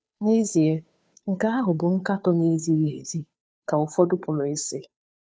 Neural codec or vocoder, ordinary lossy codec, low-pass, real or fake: codec, 16 kHz, 2 kbps, FunCodec, trained on Chinese and English, 25 frames a second; none; none; fake